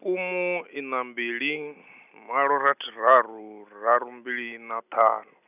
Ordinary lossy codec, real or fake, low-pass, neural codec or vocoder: none; real; 3.6 kHz; none